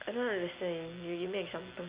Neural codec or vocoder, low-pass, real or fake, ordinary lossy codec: none; 3.6 kHz; real; Opus, 64 kbps